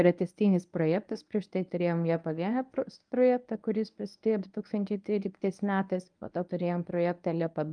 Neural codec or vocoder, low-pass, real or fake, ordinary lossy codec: codec, 24 kHz, 0.9 kbps, WavTokenizer, medium speech release version 1; 9.9 kHz; fake; Opus, 32 kbps